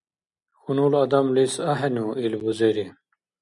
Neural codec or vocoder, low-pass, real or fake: none; 10.8 kHz; real